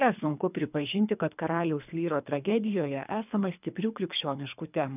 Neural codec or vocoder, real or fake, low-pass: codec, 24 kHz, 3 kbps, HILCodec; fake; 3.6 kHz